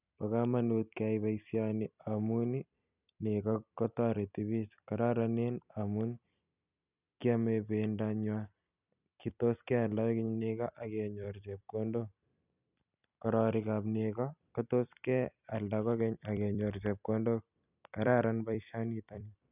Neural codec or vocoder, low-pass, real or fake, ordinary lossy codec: vocoder, 44.1 kHz, 128 mel bands every 256 samples, BigVGAN v2; 3.6 kHz; fake; none